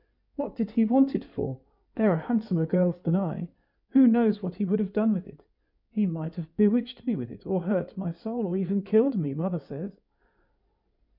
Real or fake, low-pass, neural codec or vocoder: fake; 5.4 kHz; codec, 16 kHz in and 24 kHz out, 2.2 kbps, FireRedTTS-2 codec